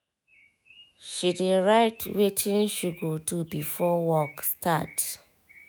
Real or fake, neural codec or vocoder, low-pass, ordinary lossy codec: fake; autoencoder, 48 kHz, 128 numbers a frame, DAC-VAE, trained on Japanese speech; none; none